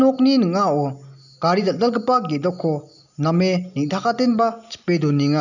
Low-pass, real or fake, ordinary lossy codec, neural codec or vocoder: 7.2 kHz; real; none; none